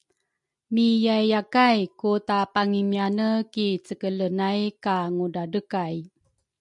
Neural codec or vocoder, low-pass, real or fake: none; 10.8 kHz; real